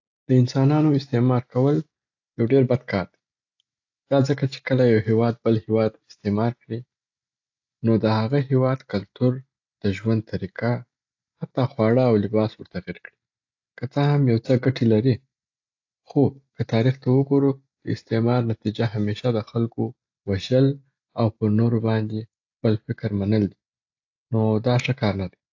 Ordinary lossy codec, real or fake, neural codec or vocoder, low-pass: AAC, 48 kbps; real; none; 7.2 kHz